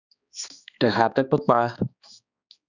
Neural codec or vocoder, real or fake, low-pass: codec, 16 kHz, 2 kbps, X-Codec, HuBERT features, trained on balanced general audio; fake; 7.2 kHz